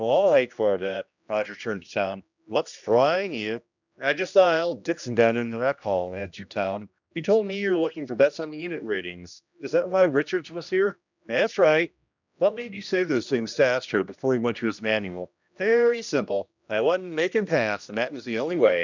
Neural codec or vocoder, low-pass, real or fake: codec, 16 kHz, 1 kbps, X-Codec, HuBERT features, trained on general audio; 7.2 kHz; fake